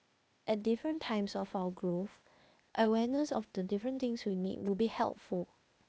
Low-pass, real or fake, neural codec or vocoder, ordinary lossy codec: none; fake; codec, 16 kHz, 0.8 kbps, ZipCodec; none